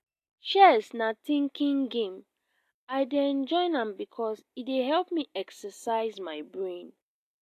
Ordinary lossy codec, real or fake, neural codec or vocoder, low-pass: AAC, 64 kbps; real; none; 14.4 kHz